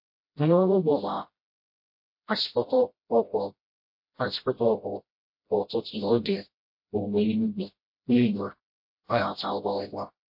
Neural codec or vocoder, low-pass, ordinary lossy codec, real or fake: codec, 16 kHz, 0.5 kbps, FreqCodec, smaller model; 5.4 kHz; MP3, 32 kbps; fake